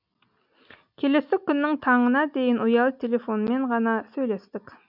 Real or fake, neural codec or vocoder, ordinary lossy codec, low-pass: real; none; none; 5.4 kHz